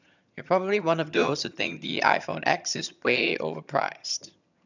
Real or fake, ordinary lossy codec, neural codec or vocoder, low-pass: fake; none; vocoder, 22.05 kHz, 80 mel bands, HiFi-GAN; 7.2 kHz